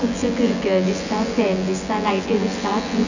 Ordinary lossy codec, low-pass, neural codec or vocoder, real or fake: none; 7.2 kHz; vocoder, 24 kHz, 100 mel bands, Vocos; fake